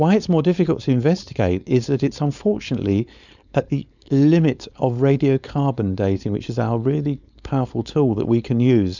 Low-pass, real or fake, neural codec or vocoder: 7.2 kHz; fake; codec, 16 kHz, 4.8 kbps, FACodec